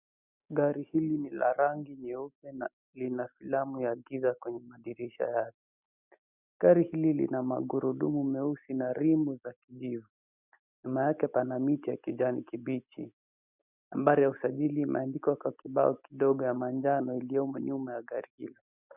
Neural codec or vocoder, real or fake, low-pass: none; real; 3.6 kHz